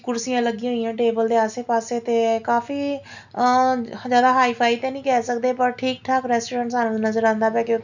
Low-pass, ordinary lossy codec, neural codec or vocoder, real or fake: 7.2 kHz; none; none; real